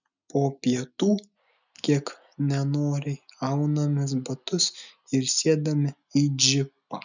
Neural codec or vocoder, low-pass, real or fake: none; 7.2 kHz; real